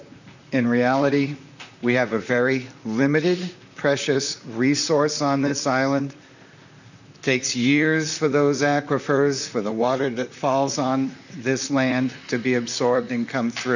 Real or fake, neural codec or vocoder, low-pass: fake; vocoder, 44.1 kHz, 128 mel bands, Pupu-Vocoder; 7.2 kHz